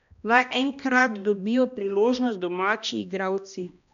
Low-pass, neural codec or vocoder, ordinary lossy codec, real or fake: 7.2 kHz; codec, 16 kHz, 1 kbps, X-Codec, HuBERT features, trained on balanced general audio; none; fake